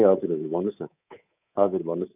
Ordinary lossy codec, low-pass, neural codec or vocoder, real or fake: AAC, 32 kbps; 3.6 kHz; codec, 24 kHz, 3.1 kbps, DualCodec; fake